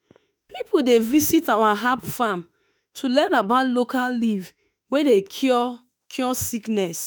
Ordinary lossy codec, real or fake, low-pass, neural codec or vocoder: none; fake; none; autoencoder, 48 kHz, 32 numbers a frame, DAC-VAE, trained on Japanese speech